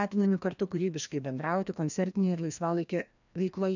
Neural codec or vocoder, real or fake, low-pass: codec, 16 kHz, 1 kbps, FreqCodec, larger model; fake; 7.2 kHz